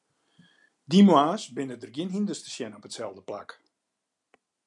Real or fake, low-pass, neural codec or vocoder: real; 10.8 kHz; none